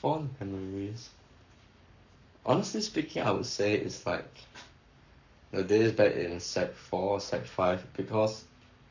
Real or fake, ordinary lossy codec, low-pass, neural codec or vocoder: fake; none; 7.2 kHz; codec, 44.1 kHz, 7.8 kbps, Pupu-Codec